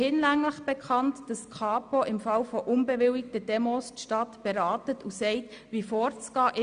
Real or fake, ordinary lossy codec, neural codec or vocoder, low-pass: real; Opus, 64 kbps; none; 9.9 kHz